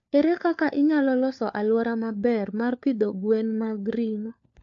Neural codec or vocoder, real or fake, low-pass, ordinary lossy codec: codec, 16 kHz, 4 kbps, FunCodec, trained on LibriTTS, 50 frames a second; fake; 7.2 kHz; AAC, 64 kbps